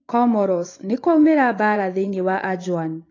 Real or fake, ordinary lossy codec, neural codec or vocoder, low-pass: fake; AAC, 32 kbps; vocoder, 24 kHz, 100 mel bands, Vocos; 7.2 kHz